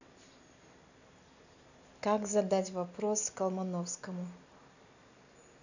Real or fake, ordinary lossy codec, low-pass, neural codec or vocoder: real; none; 7.2 kHz; none